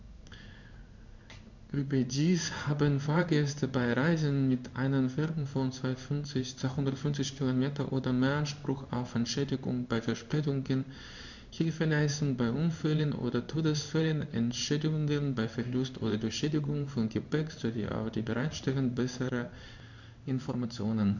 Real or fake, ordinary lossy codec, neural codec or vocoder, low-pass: fake; none; codec, 16 kHz in and 24 kHz out, 1 kbps, XY-Tokenizer; 7.2 kHz